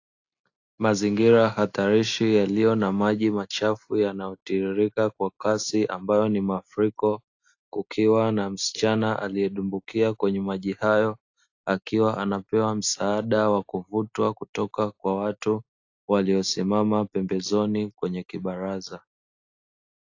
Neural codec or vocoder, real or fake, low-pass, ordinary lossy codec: none; real; 7.2 kHz; AAC, 48 kbps